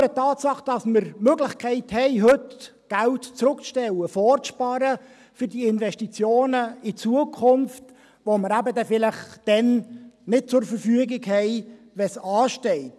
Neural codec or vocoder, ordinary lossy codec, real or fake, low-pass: none; none; real; none